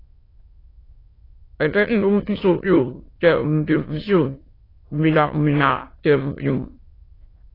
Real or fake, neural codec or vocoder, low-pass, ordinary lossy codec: fake; autoencoder, 22.05 kHz, a latent of 192 numbers a frame, VITS, trained on many speakers; 5.4 kHz; AAC, 24 kbps